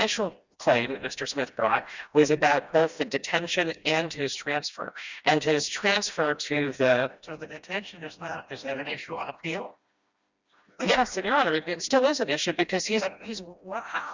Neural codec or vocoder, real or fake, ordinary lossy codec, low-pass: codec, 16 kHz, 1 kbps, FreqCodec, smaller model; fake; Opus, 64 kbps; 7.2 kHz